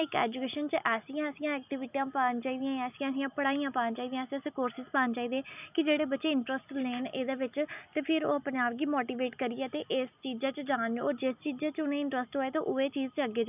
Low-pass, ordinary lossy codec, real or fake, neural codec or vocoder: 3.6 kHz; none; real; none